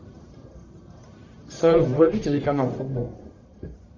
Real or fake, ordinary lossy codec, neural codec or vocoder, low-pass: fake; MP3, 64 kbps; codec, 44.1 kHz, 1.7 kbps, Pupu-Codec; 7.2 kHz